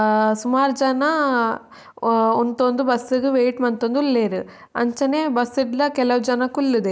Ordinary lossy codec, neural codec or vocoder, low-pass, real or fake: none; none; none; real